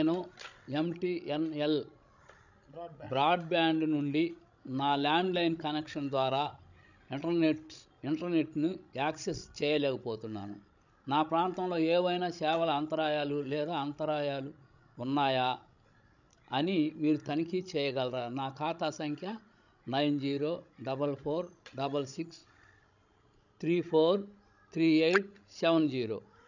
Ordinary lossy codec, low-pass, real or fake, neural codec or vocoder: none; 7.2 kHz; fake; codec, 16 kHz, 16 kbps, FreqCodec, larger model